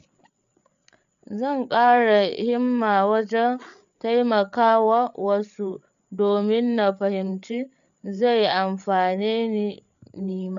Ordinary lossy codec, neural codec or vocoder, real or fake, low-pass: none; codec, 16 kHz, 8 kbps, FreqCodec, larger model; fake; 7.2 kHz